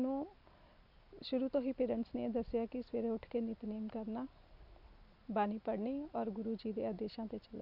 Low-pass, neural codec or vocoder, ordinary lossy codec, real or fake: 5.4 kHz; none; none; real